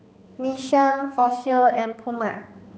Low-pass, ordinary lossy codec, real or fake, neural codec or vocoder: none; none; fake; codec, 16 kHz, 2 kbps, X-Codec, HuBERT features, trained on balanced general audio